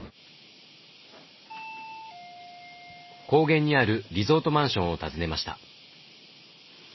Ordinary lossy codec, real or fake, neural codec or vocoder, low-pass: MP3, 24 kbps; real; none; 7.2 kHz